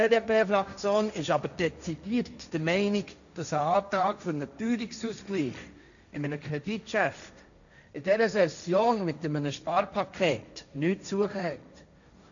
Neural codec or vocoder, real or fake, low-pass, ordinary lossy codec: codec, 16 kHz, 1.1 kbps, Voila-Tokenizer; fake; 7.2 kHz; none